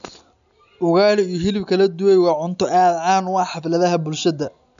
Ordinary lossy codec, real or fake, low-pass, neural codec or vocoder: MP3, 96 kbps; real; 7.2 kHz; none